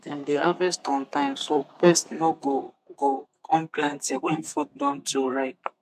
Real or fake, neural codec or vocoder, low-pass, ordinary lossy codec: fake; codec, 32 kHz, 1.9 kbps, SNAC; 14.4 kHz; AAC, 96 kbps